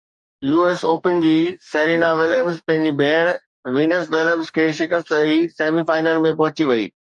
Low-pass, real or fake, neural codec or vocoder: 10.8 kHz; fake; codec, 44.1 kHz, 2.6 kbps, DAC